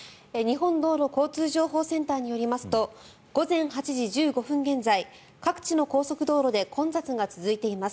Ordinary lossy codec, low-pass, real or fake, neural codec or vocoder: none; none; real; none